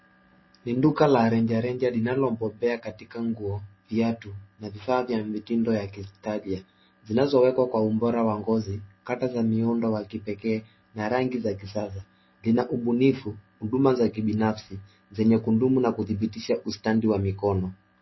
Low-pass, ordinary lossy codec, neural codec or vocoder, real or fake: 7.2 kHz; MP3, 24 kbps; none; real